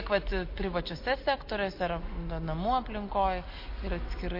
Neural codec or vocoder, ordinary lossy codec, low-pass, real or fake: none; MP3, 32 kbps; 5.4 kHz; real